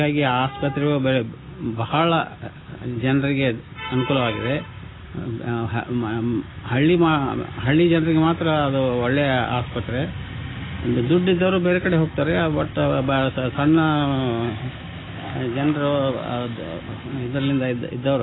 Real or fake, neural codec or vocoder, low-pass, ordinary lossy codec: real; none; 7.2 kHz; AAC, 16 kbps